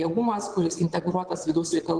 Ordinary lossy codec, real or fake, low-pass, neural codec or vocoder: Opus, 16 kbps; fake; 9.9 kHz; vocoder, 22.05 kHz, 80 mel bands, WaveNeXt